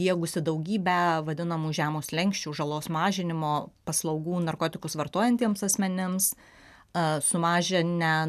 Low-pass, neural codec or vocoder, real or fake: 14.4 kHz; none; real